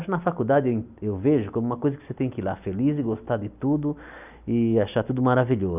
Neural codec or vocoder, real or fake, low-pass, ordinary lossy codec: none; real; 3.6 kHz; none